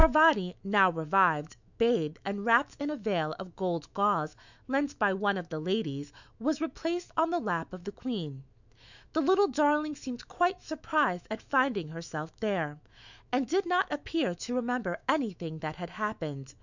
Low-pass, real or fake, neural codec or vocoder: 7.2 kHz; fake; autoencoder, 48 kHz, 128 numbers a frame, DAC-VAE, trained on Japanese speech